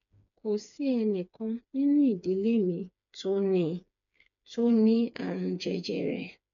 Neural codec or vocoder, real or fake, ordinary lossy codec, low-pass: codec, 16 kHz, 4 kbps, FreqCodec, smaller model; fake; none; 7.2 kHz